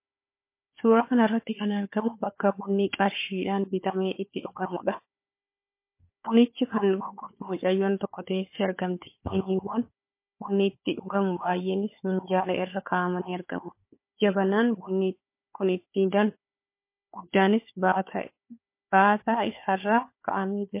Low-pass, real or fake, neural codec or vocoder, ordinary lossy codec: 3.6 kHz; fake; codec, 16 kHz, 4 kbps, FunCodec, trained on Chinese and English, 50 frames a second; MP3, 24 kbps